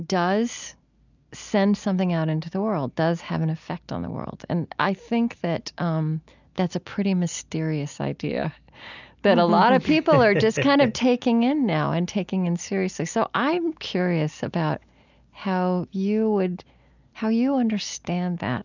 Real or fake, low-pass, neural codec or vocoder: real; 7.2 kHz; none